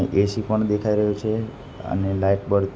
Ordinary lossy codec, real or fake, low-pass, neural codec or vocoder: none; real; none; none